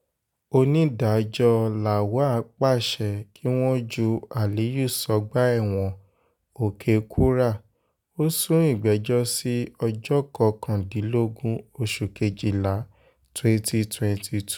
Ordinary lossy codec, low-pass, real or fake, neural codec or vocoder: none; 19.8 kHz; real; none